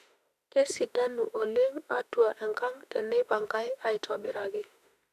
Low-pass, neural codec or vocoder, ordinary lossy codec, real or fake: 14.4 kHz; autoencoder, 48 kHz, 32 numbers a frame, DAC-VAE, trained on Japanese speech; none; fake